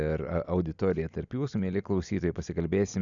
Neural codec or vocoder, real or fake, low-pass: none; real; 7.2 kHz